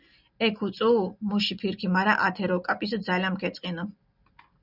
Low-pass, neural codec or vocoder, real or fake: 5.4 kHz; none; real